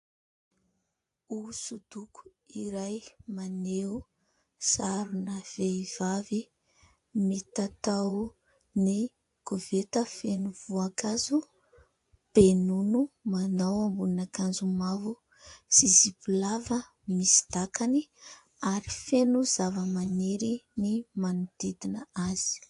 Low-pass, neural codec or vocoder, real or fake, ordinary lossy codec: 10.8 kHz; vocoder, 24 kHz, 100 mel bands, Vocos; fake; AAC, 64 kbps